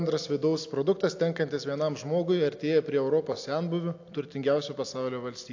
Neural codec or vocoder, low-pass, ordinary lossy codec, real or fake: none; 7.2 kHz; AAC, 48 kbps; real